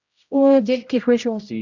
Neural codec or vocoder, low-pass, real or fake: codec, 16 kHz, 0.5 kbps, X-Codec, HuBERT features, trained on general audio; 7.2 kHz; fake